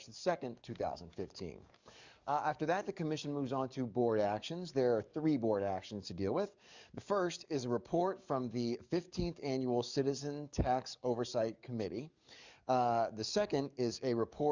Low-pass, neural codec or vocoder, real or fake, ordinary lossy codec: 7.2 kHz; codec, 44.1 kHz, 7.8 kbps, DAC; fake; Opus, 64 kbps